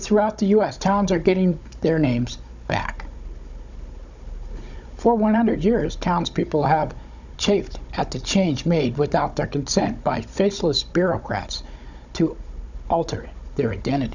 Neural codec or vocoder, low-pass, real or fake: codec, 16 kHz, 16 kbps, FunCodec, trained on Chinese and English, 50 frames a second; 7.2 kHz; fake